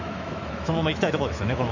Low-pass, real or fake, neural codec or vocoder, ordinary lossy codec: 7.2 kHz; fake; autoencoder, 48 kHz, 128 numbers a frame, DAC-VAE, trained on Japanese speech; none